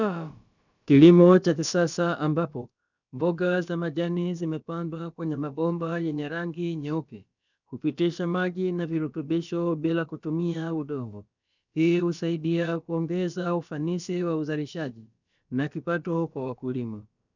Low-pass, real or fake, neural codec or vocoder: 7.2 kHz; fake; codec, 16 kHz, about 1 kbps, DyCAST, with the encoder's durations